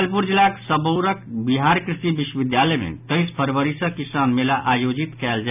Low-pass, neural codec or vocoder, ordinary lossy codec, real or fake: 3.6 kHz; none; none; real